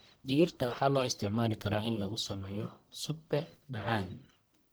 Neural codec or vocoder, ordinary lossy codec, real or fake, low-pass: codec, 44.1 kHz, 1.7 kbps, Pupu-Codec; none; fake; none